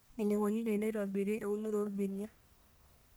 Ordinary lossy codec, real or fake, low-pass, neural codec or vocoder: none; fake; none; codec, 44.1 kHz, 1.7 kbps, Pupu-Codec